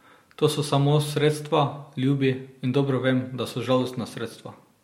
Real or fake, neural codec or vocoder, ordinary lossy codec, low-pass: real; none; MP3, 64 kbps; 19.8 kHz